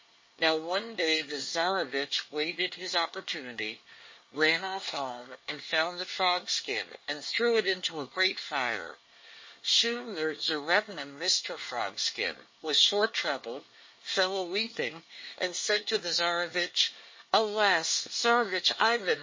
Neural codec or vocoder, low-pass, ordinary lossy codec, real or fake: codec, 24 kHz, 1 kbps, SNAC; 7.2 kHz; MP3, 32 kbps; fake